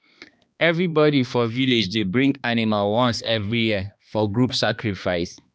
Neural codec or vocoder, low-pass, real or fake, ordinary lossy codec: codec, 16 kHz, 2 kbps, X-Codec, HuBERT features, trained on balanced general audio; none; fake; none